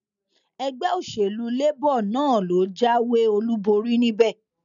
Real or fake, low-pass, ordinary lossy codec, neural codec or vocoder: real; 7.2 kHz; none; none